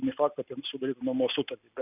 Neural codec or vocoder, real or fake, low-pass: none; real; 3.6 kHz